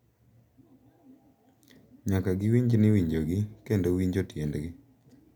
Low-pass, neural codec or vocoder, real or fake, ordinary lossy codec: 19.8 kHz; none; real; none